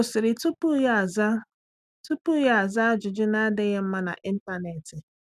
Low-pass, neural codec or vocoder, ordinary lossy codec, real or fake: 14.4 kHz; none; none; real